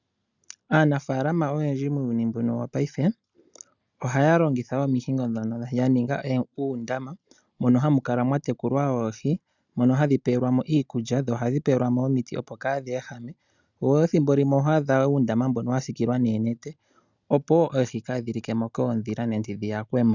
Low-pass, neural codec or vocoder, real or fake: 7.2 kHz; none; real